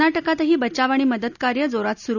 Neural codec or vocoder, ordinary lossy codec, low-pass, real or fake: none; none; 7.2 kHz; real